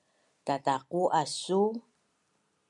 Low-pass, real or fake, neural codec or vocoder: 10.8 kHz; real; none